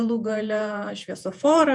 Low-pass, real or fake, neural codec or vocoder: 10.8 kHz; fake; vocoder, 48 kHz, 128 mel bands, Vocos